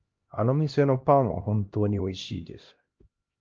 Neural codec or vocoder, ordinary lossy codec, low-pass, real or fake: codec, 16 kHz, 1 kbps, X-Codec, HuBERT features, trained on LibriSpeech; Opus, 24 kbps; 7.2 kHz; fake